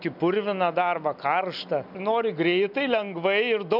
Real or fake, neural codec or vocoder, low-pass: real; none; 5.4 kHz